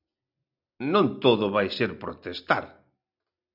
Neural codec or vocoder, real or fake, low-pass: none; real; 5.4 kHz